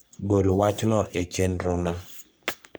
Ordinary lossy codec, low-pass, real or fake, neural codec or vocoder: none; none; fake; codec, 44.1 kHz, 3.4 kbps, Pupu-Codec